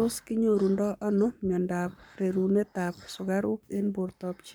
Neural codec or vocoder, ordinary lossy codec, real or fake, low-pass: codec, 44.1 kHz, 7.8 kbps, Pupu-Codec; none; fake; none